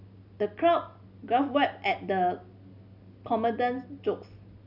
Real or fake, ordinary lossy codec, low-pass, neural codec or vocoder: real; none; 5.4 kHz; none